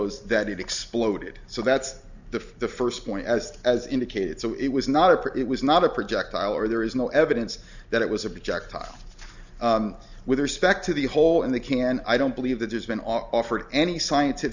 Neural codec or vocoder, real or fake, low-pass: none; real; 7.2 kHz